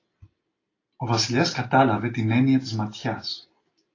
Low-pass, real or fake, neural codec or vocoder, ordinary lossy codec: 7.2 kHz; real; none; AAC, 32 kbps